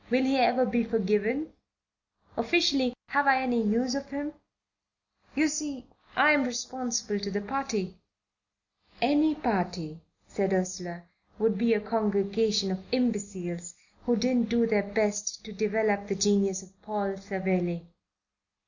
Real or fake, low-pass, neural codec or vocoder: real; 7.2 kHz; none